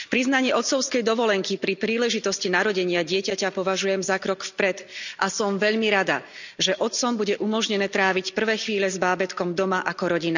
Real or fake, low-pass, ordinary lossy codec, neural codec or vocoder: real; 7.2 kHz; none; none